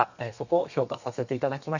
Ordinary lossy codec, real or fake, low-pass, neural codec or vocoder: none; fake; 7.2 kHz; codec, 32 kHz, 1.9 kbps, SNAC